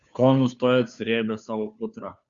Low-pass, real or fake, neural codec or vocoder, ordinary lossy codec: 7.2 kHz; fake; codec, 16 kHz, 2 kbps, FunCodec, trained on Chinese and English, 25 frames a second; Opus, 64 kbps